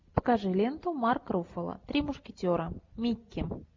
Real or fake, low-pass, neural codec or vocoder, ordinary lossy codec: real; 7.2 kHz; none; MP3, 64 kbps